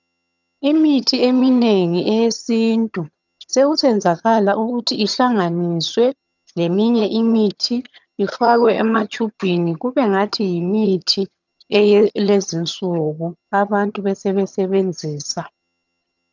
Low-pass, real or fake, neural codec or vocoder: 7.2 kHz; fake; vocoder, 22.05 kHz, 80 mel bands, HiFi-GAN